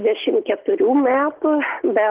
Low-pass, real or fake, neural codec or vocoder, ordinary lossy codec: 3.6 kHz; real; none; Opus, 16 kbps